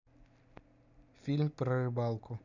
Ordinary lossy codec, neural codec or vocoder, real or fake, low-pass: none; none; real; 7.2 kHz